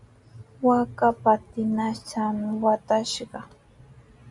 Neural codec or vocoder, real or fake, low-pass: none; real; 10.8 kHz